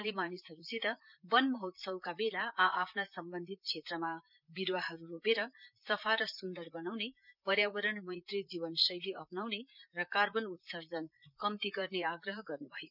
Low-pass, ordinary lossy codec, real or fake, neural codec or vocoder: 5.4 kHz; none; fake; vocoder, 44.1 kHz, 128 mel bands, Pupu-Vocoder